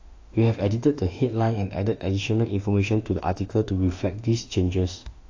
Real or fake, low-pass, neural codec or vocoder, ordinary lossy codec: fake; 7.2 kHz; autoencoder, 48 kHz, 32 numbers a frame, DAC-VAE, trained on Japanese speech; AAC, 48 kbps